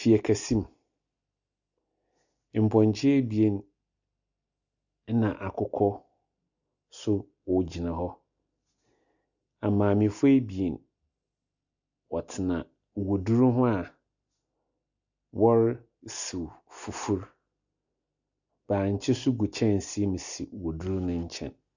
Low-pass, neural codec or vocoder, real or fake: 7.2 kHz; none; real